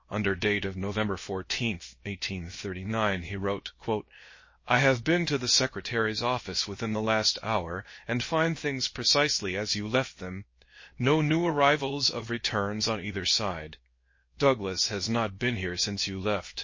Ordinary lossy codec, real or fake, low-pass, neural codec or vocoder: MP3, 32 kbps; fake; 7.2 kHz; codec, 16 kHz, 0.7 kbps, FocalCodec